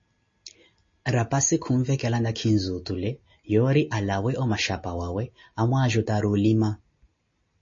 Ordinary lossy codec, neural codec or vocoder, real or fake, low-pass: MP3, 32 kbps; none; real; 7.2 kHz